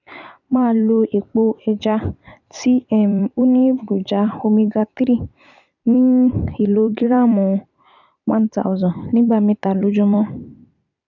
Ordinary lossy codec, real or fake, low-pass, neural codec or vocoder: none; fake; 7.2 kHz; vocoder, 44.1 kHz, 128 mel bands every 256 samples, BigVGAN v2